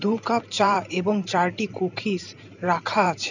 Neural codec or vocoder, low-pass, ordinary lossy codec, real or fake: vocoder, 44.1 kHz, 128 mel bands every 512 samples, BigVGAN v2; 7.2 kHz; none; fake